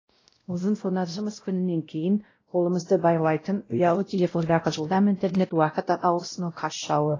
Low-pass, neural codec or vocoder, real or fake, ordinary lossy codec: 7.2 kHz; codec, 16 kHz, 0.5 kbps, X-Codec, WavLM features, trained on Multilingual LibriSpeech; fake; AAC, 32 kbps